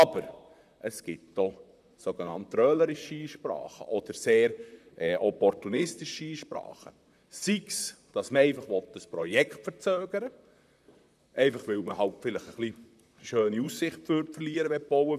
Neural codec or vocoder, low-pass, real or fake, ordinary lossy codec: vocoder, 44.1 kHz, 128 mel bands, Pupu-Vocoder; 14.4 kHz; fake; none